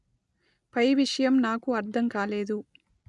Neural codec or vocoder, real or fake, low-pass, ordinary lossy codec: none; real; 10.8 kHz; none